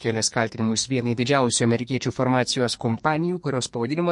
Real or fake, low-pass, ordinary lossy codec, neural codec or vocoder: fake; 10.8 kHz; MP3, 48 kbps; codec, 44.1 kHz, 2.6 kbps, SNAC